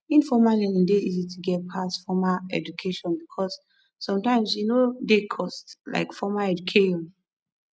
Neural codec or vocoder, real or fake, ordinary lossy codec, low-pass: none; real; none; none